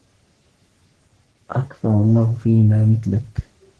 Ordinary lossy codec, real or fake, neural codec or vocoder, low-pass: Opus, 16 kbps; fake; codec, 44.1 kHz, 3.4 kbps, Pupu-Codec; 10.8 kHz